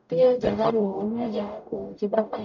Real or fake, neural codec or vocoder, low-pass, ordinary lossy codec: fake; codec, 44.1 kHz, 0.9 kbps, DAC; 7.2 kHz; none